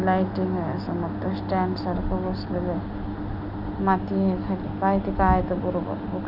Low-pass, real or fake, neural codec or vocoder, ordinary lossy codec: 5.4 kHz; real; none; none